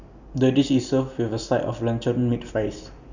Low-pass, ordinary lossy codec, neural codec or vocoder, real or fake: 7.2 kHz; none; none; real